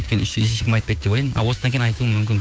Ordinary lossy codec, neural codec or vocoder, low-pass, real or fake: none; codec, 16 kHz, 6 kbps, DAC; none; fake